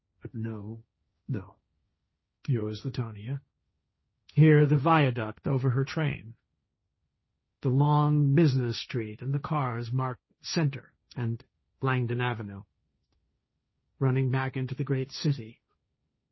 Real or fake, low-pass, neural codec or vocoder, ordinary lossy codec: fake; 7.2 kHz; codec, 16 kHz, 1.1 kbps, Voila-Tokenizer; MP3, 24 kbps